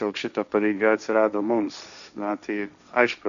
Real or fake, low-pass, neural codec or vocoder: fake; 7.2 kHz; codec, 16 kHz, 1.1 kbps, Voila-Tokenizer